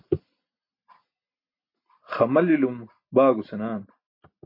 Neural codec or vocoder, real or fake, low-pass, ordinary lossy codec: none; real; 5.4 kHz; MP3, 32 kbps